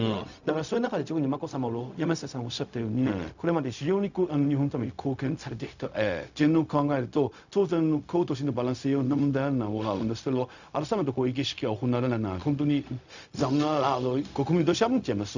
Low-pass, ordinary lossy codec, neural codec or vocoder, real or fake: 7.2 kHz; none; codec, 16 kHz, 0.4 kbps, LongCat-Audio-Codec; fake